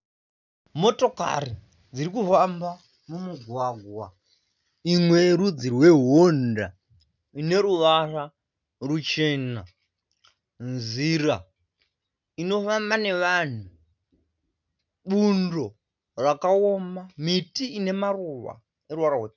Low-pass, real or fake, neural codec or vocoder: 7.2 kHz; real; none